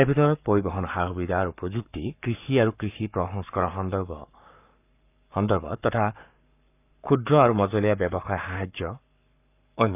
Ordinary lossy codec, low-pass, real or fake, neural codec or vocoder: none; 3.6 kHz; fake; codec, 44.1 kHz, 7.8 kbps, Pupu-Codec